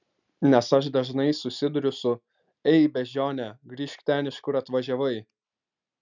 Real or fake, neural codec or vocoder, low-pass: real; none; 7.2 kHz